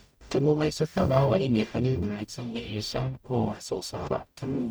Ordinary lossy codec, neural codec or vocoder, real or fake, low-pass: none; codec, 44.1 kHz, 0.9 kbps, DAC; fake; none